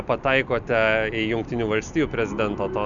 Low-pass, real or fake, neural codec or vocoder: 7.2 kHz; real; none